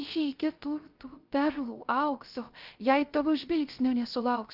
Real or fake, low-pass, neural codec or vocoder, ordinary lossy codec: fake; 5.4 kHz; codec, 16 kHz, 0.3 kbps, FocalCodec; Opus, 24 kbps